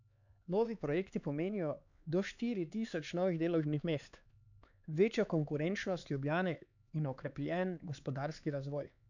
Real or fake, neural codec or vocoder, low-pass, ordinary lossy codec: fake; codec, 16 kHz, 4 kbps, X-Codec, HuBERT features, trained on LibriSpeech; 7.2 kHz; none